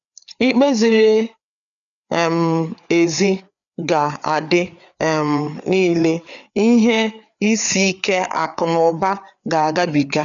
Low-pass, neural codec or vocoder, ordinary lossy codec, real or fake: 7.2 kHz; codec, 16 kHz, 4 kbps, FreqCodec, larger model; none; fake